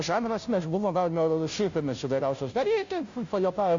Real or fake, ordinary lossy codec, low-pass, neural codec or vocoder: fake; AAC, 64 kbps; 7.2 kHz; codec, 16 kHz, 0.5 kbps, FunCodec, trained on Chinese and English, 25 frames a second